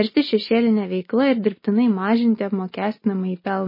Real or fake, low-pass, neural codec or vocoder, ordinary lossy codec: real; 5.4 kHz; none; MP3, 24 kbps